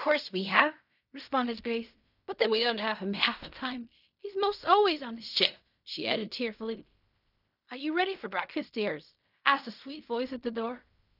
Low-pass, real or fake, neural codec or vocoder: 5.4 kHz; fake; codec, 16 kHz in and 24 kHz out, 0.4 kbps, LongCat-Audio-Codec, fine tuned four codebook decoder